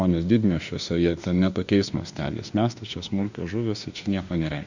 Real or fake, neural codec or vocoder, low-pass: fake; autoencoder, 48 kHz, 32 numbers a frame, DAC-VAE, trained on Japanese speech; 7.2 kHz